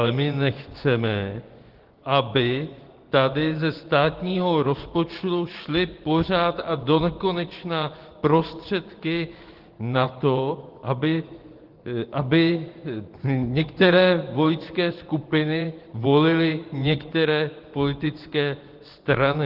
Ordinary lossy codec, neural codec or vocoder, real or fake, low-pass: Opus, 16 kbps; vocoder, 24 kHz, 100 mel bands, Vocos; fake; 5.4 kHz